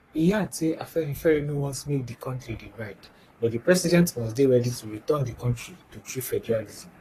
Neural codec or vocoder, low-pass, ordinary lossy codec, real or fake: codec, 44.1 kHz, 3.4 kbps, Pupu-Codec; 14.4 kHz; AAC, 48 kbps; fake